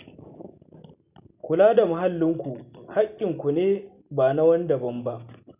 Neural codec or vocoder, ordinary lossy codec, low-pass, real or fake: none; none; 3.6 kHz; real